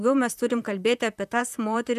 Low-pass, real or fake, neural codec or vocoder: 14.4 kHz; fake; vocoder, 44.1 kHz, 128 mel bands, Pupu-Vocoder